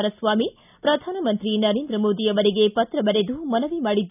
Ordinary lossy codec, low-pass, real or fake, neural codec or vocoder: none; 3.6 kHz; real; none